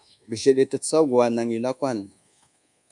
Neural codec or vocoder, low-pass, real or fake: codec, 24 kHz, 1.2 kbps, DualCodec; 10.8 kHz; fake